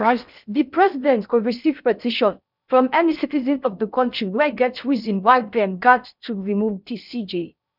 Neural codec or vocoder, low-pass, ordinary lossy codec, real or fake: codec, 16 kHz in and 24 kHz out, 0.6 kbps, FocalCodec, streaming, 2048 codes; 5.4 kHz; none; fake